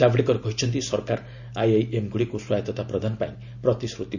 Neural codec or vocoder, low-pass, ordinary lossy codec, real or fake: none; 7.2 kHz; none; real